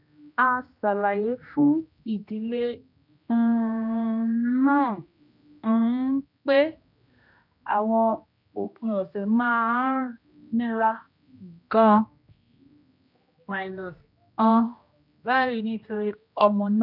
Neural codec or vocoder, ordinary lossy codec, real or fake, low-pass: codec, 16 kHz, 1 kbps, X-Codec, HuBERT features, trained on general audio; none; fake; 5.4 kHz